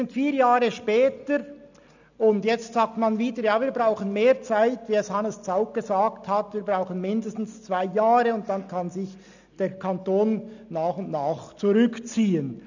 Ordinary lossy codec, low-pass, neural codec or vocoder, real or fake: none; 7.2 kHz; none; real